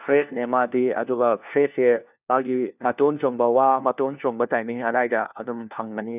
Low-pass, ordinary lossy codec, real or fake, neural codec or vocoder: 3.6 kHz; none; fake; codec, 16 kHz, 1 kbps, FunCodec, trained on LibriTTS, 50 frames a second